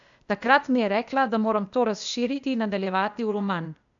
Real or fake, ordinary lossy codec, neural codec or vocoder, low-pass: fake; MP3, 96 kbps; codec, 16 kHz, 0.8 kbps, ZipCodec; 7.2 kHz